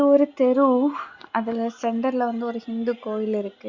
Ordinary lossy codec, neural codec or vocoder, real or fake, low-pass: Opus, 64 kbps; none; real; 7.2 kHz